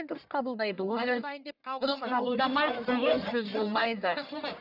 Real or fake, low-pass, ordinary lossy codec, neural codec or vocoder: fake; 5.4 kHz; none; codec, 44.1 kHz, 1.7 kbps, Pupu-Codec